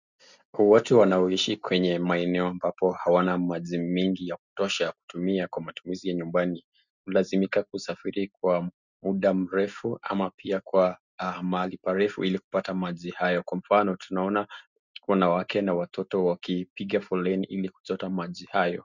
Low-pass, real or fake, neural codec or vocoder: 7.2 kHz; real; none